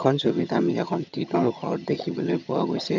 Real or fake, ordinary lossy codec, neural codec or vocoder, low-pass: fake; none; vocoder, 22.05 kHz, 80 mel bands, HiFi-GAN; 7.2 kHz